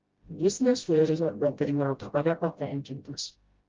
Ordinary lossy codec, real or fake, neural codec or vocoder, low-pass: Opus, 32 kbps; fake; codec, 16 kHz, 0.5 kbps, FreqCodec, smaller model; 7.2 kHz